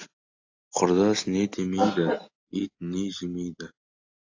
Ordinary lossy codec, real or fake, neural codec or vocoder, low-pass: AAC, 48 kbps; real; none; 7.2 kHz